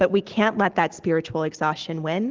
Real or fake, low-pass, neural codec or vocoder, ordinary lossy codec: real; 7.2 kHz; none; Opus, 16 kbps